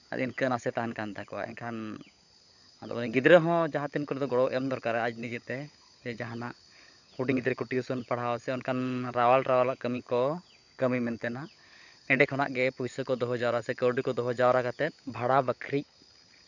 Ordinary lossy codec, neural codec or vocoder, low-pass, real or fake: none; codec, 16 kHz, 16 kbps, FunCodec, trained on LibriTTS, 50 frames a second; 7.2 kHz; fake